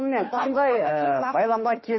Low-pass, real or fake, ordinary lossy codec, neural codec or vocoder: 7.2 kHz; fake; MP3, 24 kbps; autoencoder, 48 kHz, 32 numbers a frame, DAC-VAE, trained on Japanese speech